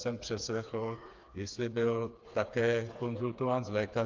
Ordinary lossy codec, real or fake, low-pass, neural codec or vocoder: Opus, 24 kbps; fake; 7.2 kHz; codec, 16 kHz, 4 kbps, FreqCodec, smaller model